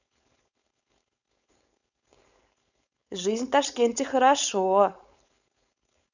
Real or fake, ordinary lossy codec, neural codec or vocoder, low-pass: fake; none; codec, 16 kHz, 4.8 kbps, FACodec; 7.2 kHz